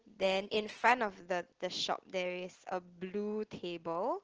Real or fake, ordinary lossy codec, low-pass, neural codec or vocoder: real; Opus, 16 kbps; 7.2 kHz; none